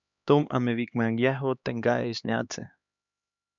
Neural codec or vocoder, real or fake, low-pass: codec, 16 kHz, 2 kbps, X-Codec, HuBERT features, trained on LibriSpeech; fake; 7.2 kHz